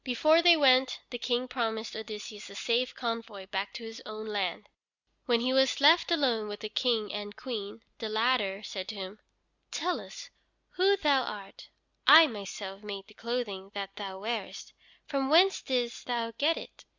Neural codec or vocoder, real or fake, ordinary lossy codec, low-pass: none; real; Opus, 64 kbps; 7.2 kHz